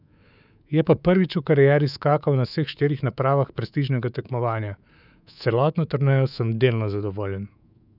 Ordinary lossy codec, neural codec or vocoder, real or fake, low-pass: none; codec, 24 kHz, 3.1 kbps, DualCodec; fake; 5.4 kHz